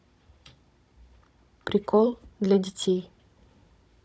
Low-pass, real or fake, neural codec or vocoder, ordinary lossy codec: none; fake; codec, 16 kHz, 16 kbps, FunCodec, trained on Chinese and English, 50 frames a second; none